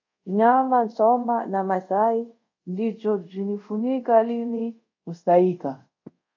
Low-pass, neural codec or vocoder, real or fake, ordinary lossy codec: 7.2 kHz; codec, 24 kHz, 0.5 kbps, DualCodec; fake; AAC, 48 kbps